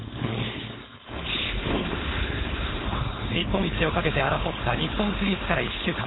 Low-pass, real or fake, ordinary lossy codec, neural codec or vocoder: 7.2 kHz; fake; AAC, 16 kbps; codec, 16 kHz, 4.8 kbps, FACodec